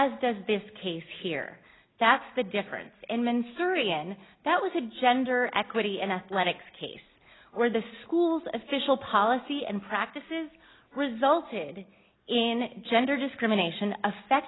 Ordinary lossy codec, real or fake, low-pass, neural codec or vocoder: AAC, 16 kbps; real; 7.2 kHz; none